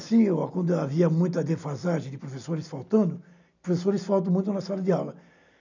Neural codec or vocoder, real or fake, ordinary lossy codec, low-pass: none; real; none; 7.2 kHz